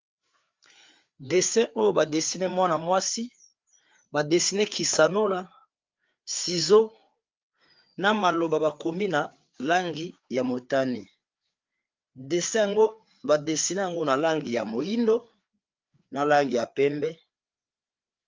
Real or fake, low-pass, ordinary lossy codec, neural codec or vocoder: fake; 7.2 kHz; Opus, 32 kbps; codec, 16 kHz, 4 kbps, FreqCodec, larger model